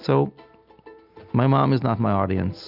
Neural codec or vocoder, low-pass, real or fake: none; 5.4 kHz; real